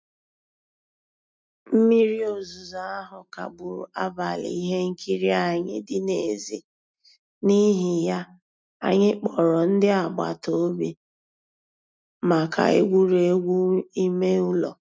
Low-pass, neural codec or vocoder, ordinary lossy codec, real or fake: none; none; none; real